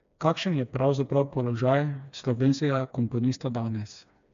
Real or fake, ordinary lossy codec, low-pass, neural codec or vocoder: fake; MP3, 64 kbps; 7.2 kHz; codec, 16 kHz, 2 kbps, FreqCodec, smaller model